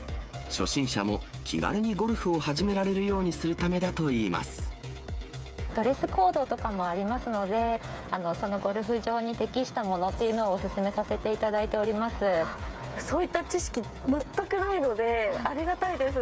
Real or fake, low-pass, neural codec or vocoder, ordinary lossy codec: fake; none; codec, 16 kHz, 8 kbps, FreqCodec, smaller model; none